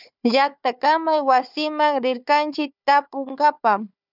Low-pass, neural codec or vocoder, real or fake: 5.4 kHz; codec, 16 kHz, 4 kbps, FunCodec, trained on Chinese and English, 50 frames a second; fake